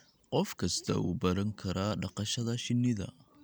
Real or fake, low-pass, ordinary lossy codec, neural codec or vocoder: real; none; none; none